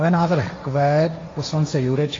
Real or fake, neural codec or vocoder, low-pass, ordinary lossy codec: fake; codec, 16 kHz, 1.1 kbps, Voila-Tokenizer; 7.2 kHz; AAC, 32 kbps